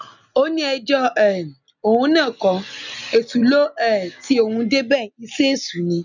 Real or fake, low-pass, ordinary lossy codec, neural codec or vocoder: real; 7.2 kHz; none; none